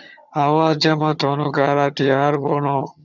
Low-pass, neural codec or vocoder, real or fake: 7.2 kHz; vocoder, 22.05 kHz, 80 mel bands, HiFi-GAN; fake